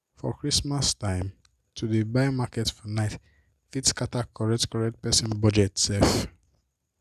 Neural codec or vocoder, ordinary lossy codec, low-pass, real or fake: none; none; 14.4 kHz; real